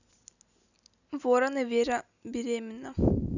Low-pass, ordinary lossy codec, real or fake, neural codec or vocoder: 7.2 kHz; none; real; none